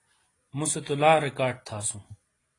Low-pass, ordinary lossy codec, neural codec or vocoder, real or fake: 10.8 kHz; AAC, 32 kbps; none; real